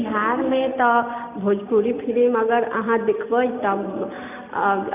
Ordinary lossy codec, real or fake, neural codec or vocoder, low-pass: none; real; none; 3.6 kHz